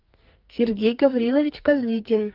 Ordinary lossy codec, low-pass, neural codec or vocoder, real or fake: Opus, 24 kbps; 5.4 kHz; codec, 44.1 kHz, 2.6 kbps, SNAC; fake